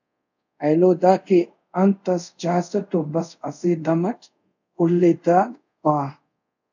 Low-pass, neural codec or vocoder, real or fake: 7.2 kHz; codec, 24 kHz, 0.5 kbps, DualCodec; fake